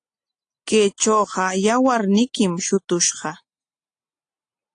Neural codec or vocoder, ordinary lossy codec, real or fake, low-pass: none; AAC, 48 kbps; real; 9.9 kHz